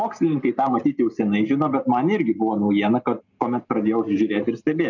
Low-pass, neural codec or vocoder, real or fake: 7.2 kHz; none; real